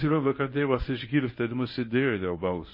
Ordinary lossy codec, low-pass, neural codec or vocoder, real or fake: MP3, 24 kbps; 5.4 kHz; codec, 24 kHz, 0.9 kbps, WavTokenizer, medium speech release version 1; fake